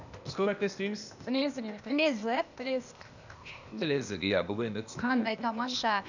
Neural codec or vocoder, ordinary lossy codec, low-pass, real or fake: codec, 16 kHz, 0.8 kbps, ZipCodec; none; 7.2 kHz; fake